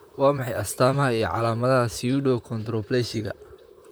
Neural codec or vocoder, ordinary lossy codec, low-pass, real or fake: vocoder, 44.1 kHz, 128 mel bands, Pupu-Vocoder; none; none; fake